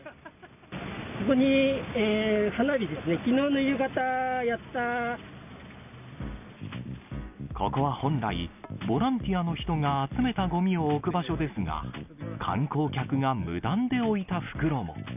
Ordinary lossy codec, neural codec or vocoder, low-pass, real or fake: none; none; 3.6 kHz; real